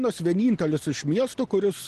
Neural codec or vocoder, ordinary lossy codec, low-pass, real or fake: none; Opus, 16 kbps; 10.8 kHz; real